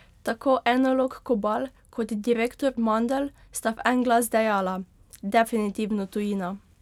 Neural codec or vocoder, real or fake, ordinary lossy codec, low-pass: none; real; none; 19.8 kHz